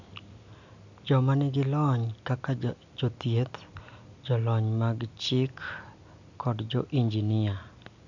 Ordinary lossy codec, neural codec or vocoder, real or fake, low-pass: none; none; real; 7.2 kHz